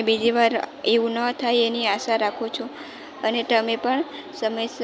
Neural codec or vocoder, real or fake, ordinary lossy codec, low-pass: none; real; none; none